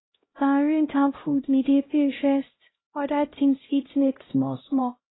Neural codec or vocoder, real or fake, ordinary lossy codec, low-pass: codec, 16 kHz, 0.5 kbps, X-Codec, HuBERT features, trained on LibriSpeech; fake; AAC, 16 kbps; 7.2 kHz